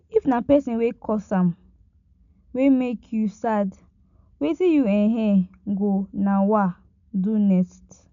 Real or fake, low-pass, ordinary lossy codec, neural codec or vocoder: real; 7.2 kHz; none; none